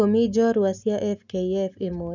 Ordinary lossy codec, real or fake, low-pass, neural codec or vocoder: none; real; 7.2 kHz; none